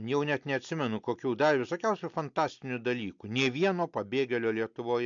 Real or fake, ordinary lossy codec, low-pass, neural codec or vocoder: real; MP3, 64 kbps; 7.2 kHz; none